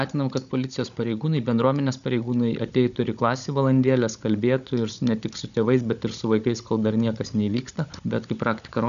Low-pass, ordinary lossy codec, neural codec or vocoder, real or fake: 7.2 kHz; MP3, 96 kbps; codec, 16 kHz, 16 kbps, FunCodec, trained on Chinese and English, 50 frames a second; fake